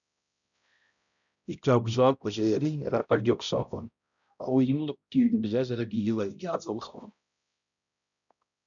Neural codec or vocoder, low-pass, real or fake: codec, 16 kHz, 0.5 kbps, X-Codec, HuBERT features, trained on balanced general audio; 7.2 kHz; fake